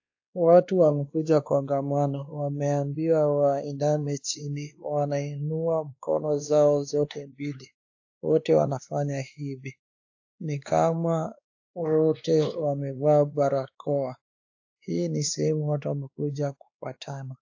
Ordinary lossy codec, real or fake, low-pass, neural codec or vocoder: AAC, 48 kbps; fake; 7.2 kHz; codec, 16 kHz, 2 kbps, X-Codec, WavLM features, trained on Multilingual LibriSpeech